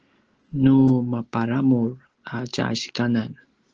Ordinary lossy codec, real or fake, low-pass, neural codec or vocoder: Opus, 16 kbps; real; 7.2 kHz; none